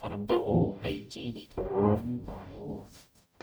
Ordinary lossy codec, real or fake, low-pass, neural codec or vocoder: none; fake; none; codec, 44.1 kHz, 0.9 kbps, DAC